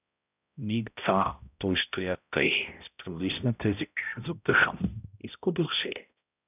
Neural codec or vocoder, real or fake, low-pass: codec, 16 kHz, 0.5 kbps, X-Codec, HuBERT features, trained on balanced general audio; fake; 3.6 kHz